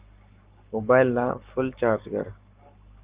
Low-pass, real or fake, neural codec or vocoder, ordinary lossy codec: 3.6 kHz; fake; codec, 44.1 kHz, 7.8 kbps, Pupu-Codec; Opus, 24 kbps